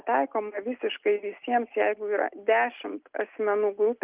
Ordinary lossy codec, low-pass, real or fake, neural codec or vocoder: Opus, 32 kbps; 3.6 kHz; real; none